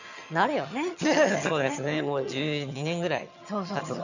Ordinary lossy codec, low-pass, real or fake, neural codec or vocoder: none; 7.2 kHz; fake; vocoder, 22.05 kHz, 80 mel bands, HiFi-GAN